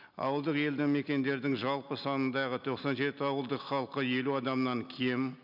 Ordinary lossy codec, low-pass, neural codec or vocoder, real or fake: none; 5.4 kHz; none; real